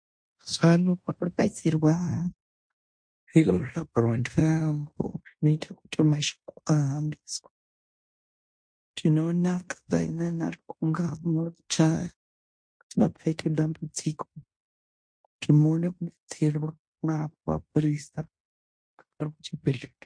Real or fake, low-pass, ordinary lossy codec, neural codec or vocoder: fake; 9.9 kHz; MP3, 48 kbps; codec, 16 kHz in and 24 kHz out, 0.9 kbps, LongCat-Audio-Codec, fine tuned four codebook decoder